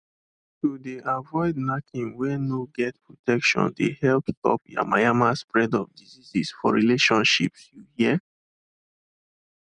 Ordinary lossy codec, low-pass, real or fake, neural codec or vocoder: none; 9.9 kHz; real; none